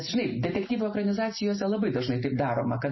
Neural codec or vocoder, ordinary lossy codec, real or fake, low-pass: none; MP3, 24 kbps; real; 7.2 kHz